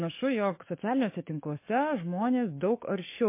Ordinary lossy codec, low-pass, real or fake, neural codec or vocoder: MP3, 24 kbps; 3.6 kHz; fake; codec, 44.1 kHz, 7.8 kbps, DAC